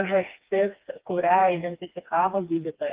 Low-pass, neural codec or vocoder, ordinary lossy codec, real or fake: 3.6 kHz; codec, 16 kHz, 2 kbps, FreqCodec, smaller model; Opus, 32 kbps; fake